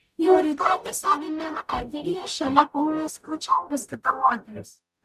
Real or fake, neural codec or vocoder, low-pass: fake; codec, 44.1 kHz, 0.9 kbps, DAC; 14.4 kHz